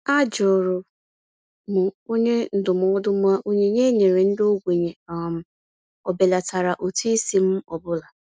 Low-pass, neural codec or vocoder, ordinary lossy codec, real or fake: none; none; none; real